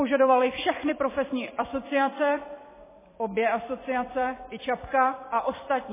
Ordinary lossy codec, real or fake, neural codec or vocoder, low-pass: MP3, 16 kbps; fake; vocoder, 44.1 kHz, 128 mel bands every 256 samples, BigVGAN v2; 3.6 kHz